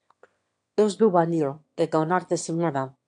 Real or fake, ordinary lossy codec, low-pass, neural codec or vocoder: fake; AAC, 64 kbps; 9.9 kHz; autoencoder, 22.05 kHz, a latent of 192 numbers a frame, VITS, trained on one speaker